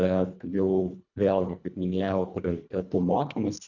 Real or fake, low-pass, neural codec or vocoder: fake; 7.2 kHz; codec, 24 kHz, 1.5 kbps, HILCodec